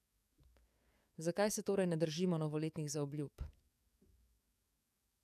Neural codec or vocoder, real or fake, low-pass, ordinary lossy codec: codec, 44.1 kHz, 7.8 kbps, DAC; fake; 14.4 kHz; none